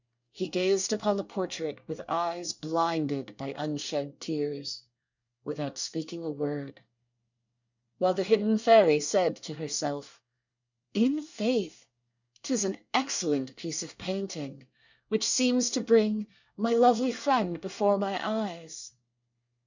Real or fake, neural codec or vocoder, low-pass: fake; codec, 24 kHz, 1 kbps, SNAC; 7.2 kHz